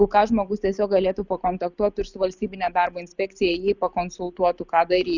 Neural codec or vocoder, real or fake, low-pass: none; real; 7.2 kHz